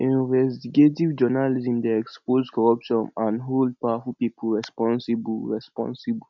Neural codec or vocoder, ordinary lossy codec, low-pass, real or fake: none; none; 7.2 kHz; real